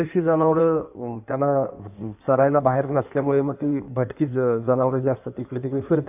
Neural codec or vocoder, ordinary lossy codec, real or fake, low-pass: codec, 16 kHz in and 24 kHz out, 1.1 kbps, FireRedTTS-2 codec; none; fake; 3.6 kHz